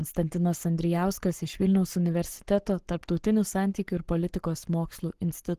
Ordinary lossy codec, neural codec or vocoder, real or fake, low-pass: Opus, 16 kbps; codec, 44.1 kHz, 7.8 kbps, Pupu-Codec; fake; 14.4 kHz